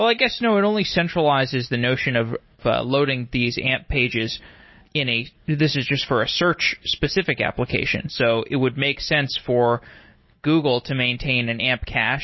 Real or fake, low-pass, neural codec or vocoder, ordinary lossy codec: real; 7.2 kHz; none; MP3, 24 kbps